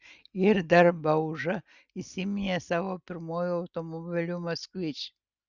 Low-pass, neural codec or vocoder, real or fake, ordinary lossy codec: 7.2 kHz; none; real; Opus, 64 kbps